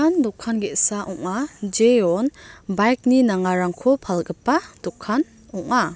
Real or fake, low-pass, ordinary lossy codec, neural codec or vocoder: real; none; none; none